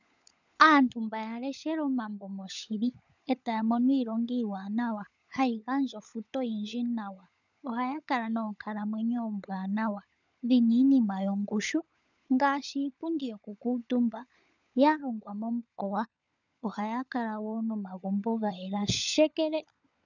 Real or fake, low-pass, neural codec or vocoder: fake; 7.2 kHz; codec, 16 kHz, 16 kbps, FunCodec, trained on LibriTTS, 50 frames a second